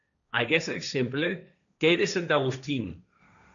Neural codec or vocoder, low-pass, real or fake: codec, 16 kHz, 1.1 kbps, Voila-Tokenizer; 7.2 kHz; fake